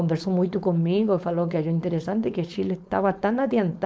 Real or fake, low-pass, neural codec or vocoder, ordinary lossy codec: fake; none; codec, 16 kHz, 4.8 kbps, FACodec; none